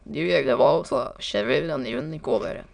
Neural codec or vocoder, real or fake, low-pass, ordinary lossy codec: autoencoder, 22.05 kHz, a latent of 192 numbers a frame, VITS, trained on many speakers; fake; 9.9 kHz; none